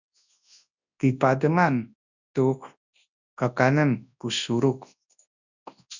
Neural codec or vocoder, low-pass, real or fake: codec, 24 kHz, 0.9 kbps, WavTokenizer, large speech release; 7.2 kHz; fake